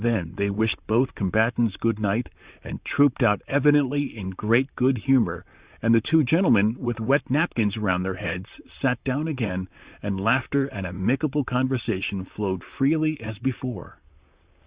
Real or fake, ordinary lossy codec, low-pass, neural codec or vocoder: fake; Opus, 64 kbps; 3.6 kHz; vocoder, 44.1 kHz, 128 mel bands, Pupu-Vocoder